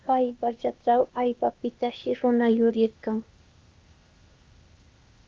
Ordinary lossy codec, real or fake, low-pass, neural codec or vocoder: Opus, 24 kbps; fake; 7.2 kHz; codec, 16 kHz, 0.8 kbps, ZipCodec